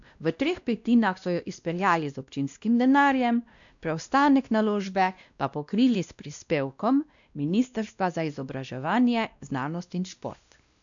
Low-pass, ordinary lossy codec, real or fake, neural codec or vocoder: 7.2 kHz; MP3, 96 kbps; fake; codec, 16 kHz, 1 kbps, X-Codec, WavLM features, trained on Multilingual LibriSpeech